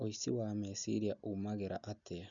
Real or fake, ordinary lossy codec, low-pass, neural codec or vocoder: real; MP3, 96 kbps; 7.2 kHz; none